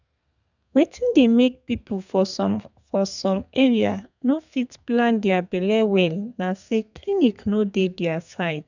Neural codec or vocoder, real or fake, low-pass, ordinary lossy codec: codec, 32 kHz, 1.9 kbps, SNAC; fake; 7.2 kHz; none